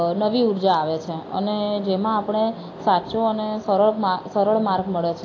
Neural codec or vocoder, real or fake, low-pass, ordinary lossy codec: none; real; 7.2 kHz; AAC, 32 kbps